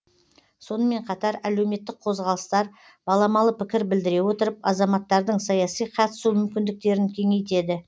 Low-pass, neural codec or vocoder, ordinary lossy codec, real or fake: none; none; none; real